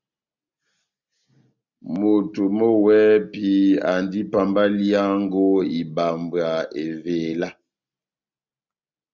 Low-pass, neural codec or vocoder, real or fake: 7.2 kHz; none; real